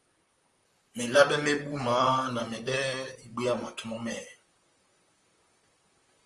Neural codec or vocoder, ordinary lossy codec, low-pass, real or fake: vocoder, 44.1 kHz, 128 mel bands, Pupu-Vocoder; Opus, 32 kbps; 10.8 kHz; fake